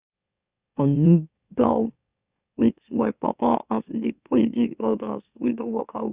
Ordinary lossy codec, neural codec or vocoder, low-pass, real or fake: Opus, 64 kbps; autoencoder, 44.1 kHz, a latent of 192 numbers a frame, MeloTTS; 3.6 kHz; fake